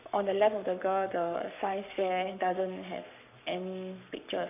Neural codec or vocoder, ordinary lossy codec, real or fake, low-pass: codec, 44.1 kHz, 7.8 kbps, Pupu-Codec; none; fake; 3.6 kHz